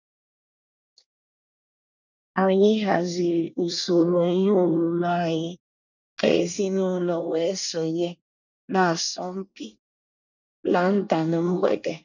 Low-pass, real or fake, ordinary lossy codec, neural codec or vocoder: 7.2 kHz; fake; none; codec, 24 kHz, 1 kbps, SNAC